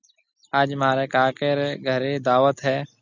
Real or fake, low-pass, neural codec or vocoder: real; 7.2 kHz; none